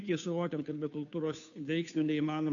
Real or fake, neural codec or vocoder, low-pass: fake; codec, 16 kHz, 2 kbps, FunCodec, trained on Chinese and English, 25 frames a second; 7.2 kHz